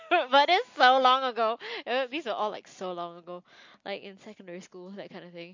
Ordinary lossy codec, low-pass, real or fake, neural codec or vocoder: MP3, 48 kbps; 7.2 kHz; real; none